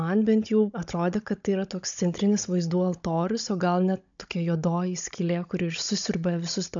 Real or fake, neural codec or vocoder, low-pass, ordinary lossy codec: fake; codec, 16 kHz, 16 kbps, FunCodec, trained on Chinese and English, 50 frames a second; 7.2 kHz; MP3, 64 kbps